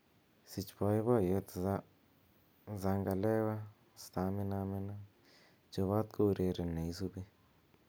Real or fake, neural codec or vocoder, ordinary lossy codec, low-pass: real; none; none; none